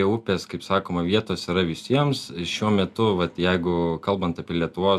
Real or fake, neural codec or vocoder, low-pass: real; none; 14.4 kHz